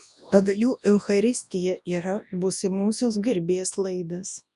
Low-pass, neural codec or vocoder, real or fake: 10.8 kHz; codec, 24 kHz, 0.9 kbps, WavTokenizer, large speech release; fake